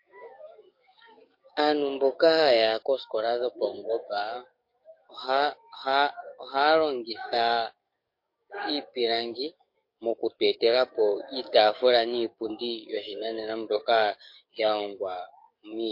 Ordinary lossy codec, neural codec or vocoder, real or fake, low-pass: MP3, 32 kbps; codec, 44.1 kHz, 7.8 kbps, DAC; fake; 5.4 kHz